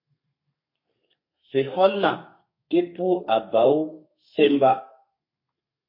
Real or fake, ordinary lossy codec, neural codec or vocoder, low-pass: fake; MP3, 32 kbps; codec, 32 kHz, 1.9 kbps, SNAC; 5.4 kHz